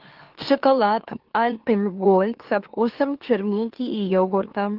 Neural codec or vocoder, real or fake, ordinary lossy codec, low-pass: autoencoder, 44.1 kHz, a latent of 192 numbers a frame, MeloTTS; fake; Opus, 16 kbps; 5.4 kHz